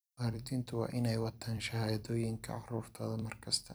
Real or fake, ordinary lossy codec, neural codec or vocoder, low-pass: fake; none; vocoder, 44.1 kHz, 128 mel bands every 256 samples, BigVGAN v2; none